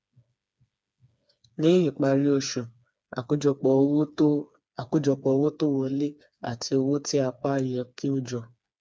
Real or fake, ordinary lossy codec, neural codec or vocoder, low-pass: fake; none; codec, 16 kHz, 4 kbps, FreqCodec, smaller model; none